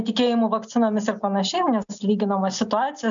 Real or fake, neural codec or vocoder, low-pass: real; none; 7.2 kHz